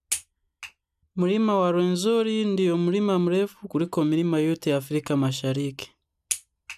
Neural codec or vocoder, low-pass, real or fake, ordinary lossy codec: none; 14.4 kHz; real; none